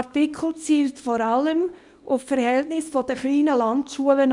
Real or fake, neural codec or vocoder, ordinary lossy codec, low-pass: fake; codec, 24 kHz, 0.9 kbps, WavTokenizer, small release; none; 10.8 kHz